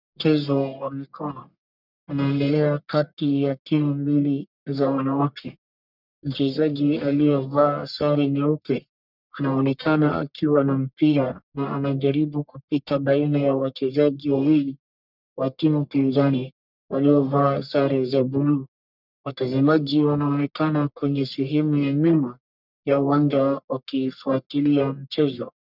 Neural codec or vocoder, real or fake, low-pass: codec, 44.1 kHz, 1.7 kbps, Pupu-Codec; fake; 5.4 kHz